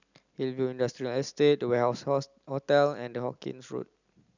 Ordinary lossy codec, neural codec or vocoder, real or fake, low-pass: none; none; real; 7.2 kHz